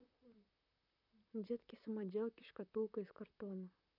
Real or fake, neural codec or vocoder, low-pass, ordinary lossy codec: fake; vocoder, 44.1 kHz, 128 mel bands, Pupu-Vocoder; 5.4 kHz; none